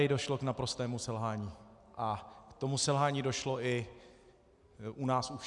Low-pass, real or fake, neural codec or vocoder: 10.8 kHz; real; none